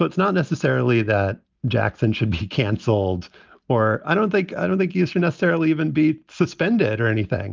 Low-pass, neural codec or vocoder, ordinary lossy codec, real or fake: 7.2 kHz; none; Opus, 24 kbps; real